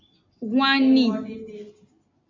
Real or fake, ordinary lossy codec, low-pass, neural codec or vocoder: real; MP3, 48 kbps; 7.2 kHz; none